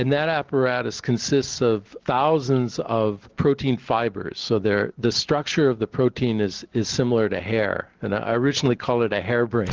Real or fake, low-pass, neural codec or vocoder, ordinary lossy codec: real; 7.2 kHz; none; Opus, 16 kbps